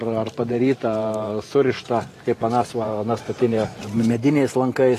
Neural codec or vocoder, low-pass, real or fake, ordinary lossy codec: vocoder, 44.1 kHz, 128 mel bands every 512 samples, BigVGAN v2; 14.4 kHz; fake; AAC, 48 kbps